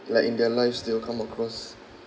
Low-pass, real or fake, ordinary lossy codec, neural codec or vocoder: none; real; none; none